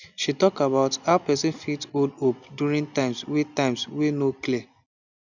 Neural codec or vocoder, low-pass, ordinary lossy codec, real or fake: none; 7.2 kHz; none; real